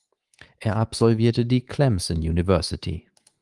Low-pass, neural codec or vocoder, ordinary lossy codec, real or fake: 10.8 kHz; codec, 24 kHz, 3.1 kbps, DualCodec; Opus, 24 kbps; fake